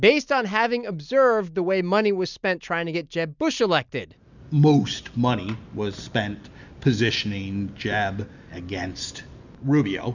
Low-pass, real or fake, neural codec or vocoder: 7.2 kHz; real; none